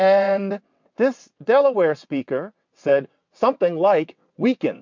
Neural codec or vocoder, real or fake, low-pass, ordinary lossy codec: vocoder, 22.05 kHz, 80 mel bands, WaveNeXt; fake; 7.2 kHz; MP3, 64 kbps